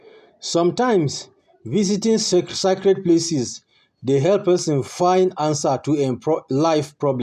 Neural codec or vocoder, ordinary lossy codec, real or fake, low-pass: none; none; real; 14.4 kHz